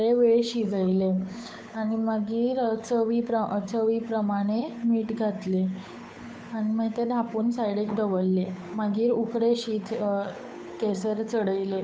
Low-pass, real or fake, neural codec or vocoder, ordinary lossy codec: none; fake; codec, 16 kHz, 8 kbps, FunCodec, trained on Chinese and English, 25 frames a second; none